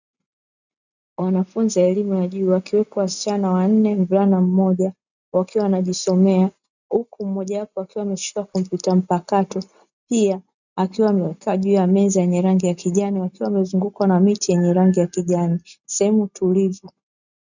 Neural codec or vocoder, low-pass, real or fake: none; 7.2 kHz; real